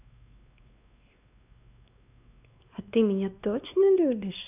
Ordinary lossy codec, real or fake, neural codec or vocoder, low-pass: none; fake; codec, 16 kHz, 2 kbps, X-Codec, WavLM features, trained on Multilingual LibriSpeech; 3.6 kHz